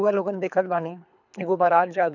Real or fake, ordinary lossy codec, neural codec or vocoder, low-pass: fake; none; codec, 24 kHz, 3 kbps, HILCodec; 7.2 kHz